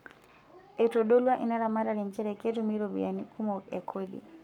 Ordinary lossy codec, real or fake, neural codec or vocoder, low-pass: none; fake; codec, 44.1 kHz, 7.8 kbps, Pupu-Codec; 19.8 kHz